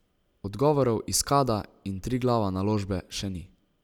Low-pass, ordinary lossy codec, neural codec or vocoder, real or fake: 19.8 kHz; none; none; real